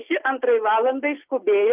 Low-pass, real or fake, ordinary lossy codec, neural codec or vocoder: 3.6 kHz; real; Opus, 24 kbps; none